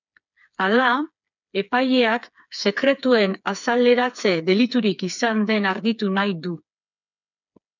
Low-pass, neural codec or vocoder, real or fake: 7.2 kHz; codec, 16 kHz, 4 kbps, FreqCodec, smaller model; fake